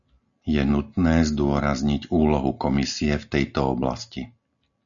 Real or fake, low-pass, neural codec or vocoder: real; 7.2 kHz; none